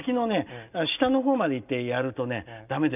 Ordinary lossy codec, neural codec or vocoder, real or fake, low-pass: none; none; real; 3.6 kHz